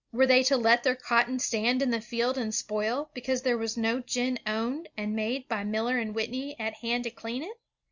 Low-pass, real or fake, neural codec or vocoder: 7.2 kHz; real; none